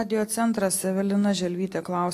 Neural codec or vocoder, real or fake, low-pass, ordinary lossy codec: none; real; 14.4 kHz; AAC, 64 kbps